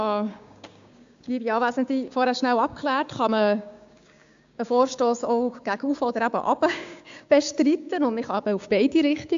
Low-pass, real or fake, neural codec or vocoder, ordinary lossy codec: 7.2 kHz; fake; codec, 16 kHz, 6 kbps, DAC; AAC, 64 kbps